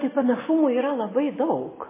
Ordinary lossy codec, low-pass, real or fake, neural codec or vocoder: MP3, 16 kbps; 3.6 kHz; real; none